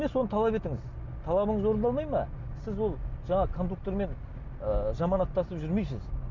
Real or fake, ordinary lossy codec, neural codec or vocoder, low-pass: real; none; none; 7.2 kHz